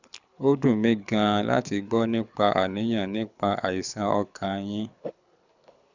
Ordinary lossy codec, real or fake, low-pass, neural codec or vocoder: none; fake; 7.2 kHz; codec, 24 kHz, 6 kbps, HILCodec